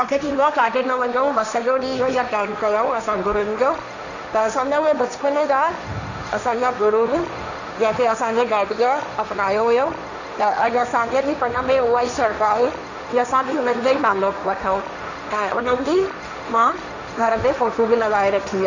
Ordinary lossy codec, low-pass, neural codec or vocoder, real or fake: none; 7.2 kHz; codec, 16 kHz, 1.1 kbps, Voila-Tokenizer; fake